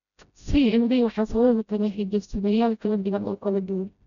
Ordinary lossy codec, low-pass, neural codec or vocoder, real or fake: none; 7.2 kHz; codec, 16 kHz, 0.5 kbps, FreqCodec, smaller model; fake